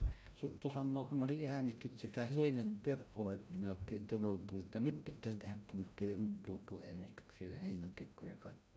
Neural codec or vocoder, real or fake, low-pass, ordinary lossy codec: codec, 16 kHz, 0.5 kbps, FreqCodec, larger model; fake; none; none